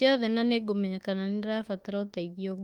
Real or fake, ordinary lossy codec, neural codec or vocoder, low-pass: fake; Opus, 24 kbps; autoencoder, 48 kHz, 32 numbers a frame, DAC-VAE, trained on Japanese speech; 19.8 kHz